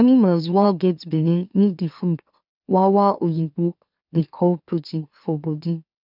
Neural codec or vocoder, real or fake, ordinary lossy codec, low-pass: autoencoder, 44.1 kHz, a latent of 192 numbers a frame, MeloTTS; fake; none; 5.4 kHz